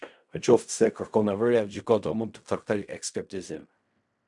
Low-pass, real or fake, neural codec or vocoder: 10.8 kHz; fake; codec, 16 kHz in and 24 kHz out, 0.4 kbps, LongCat-Audio-Codec, fine tuned four codebook decoder